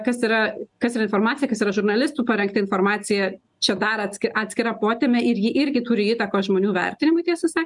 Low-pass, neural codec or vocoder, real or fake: 10.8 kHz; none; real